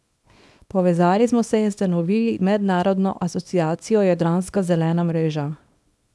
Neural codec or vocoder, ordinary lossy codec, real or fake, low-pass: codec, 24 kHz, 0.9 kbps, WavTokenizer, small release; none; fake; none